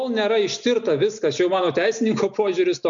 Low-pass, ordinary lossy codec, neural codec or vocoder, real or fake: 7.2 kHz; MP3, 64 kbps; none; real